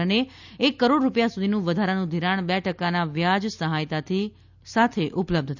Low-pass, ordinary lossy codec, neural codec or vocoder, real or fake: 7.2 kHz; none; none; real